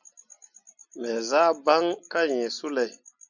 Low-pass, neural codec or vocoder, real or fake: 7.2 kHz; none; real